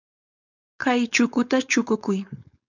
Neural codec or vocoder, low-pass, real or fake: codec, 16 kHz, 4.8 kbps, FACodec; 7.2 kHz; fake